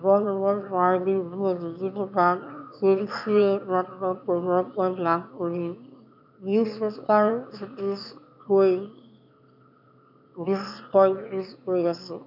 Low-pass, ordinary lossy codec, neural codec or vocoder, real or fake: 5.4 kHz; none; autoencoder, 22.05 kHz, a latent of 192 numbers a frame, VITS, trained on one speaker; fake